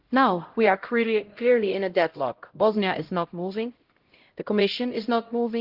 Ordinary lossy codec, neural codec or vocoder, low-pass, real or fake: Opus, 16 kbps; codec, 16 kHz, 0.5 kbps, X-Codec, HuBERT features, trained on LibriSpeech; 5.4 kHz; fake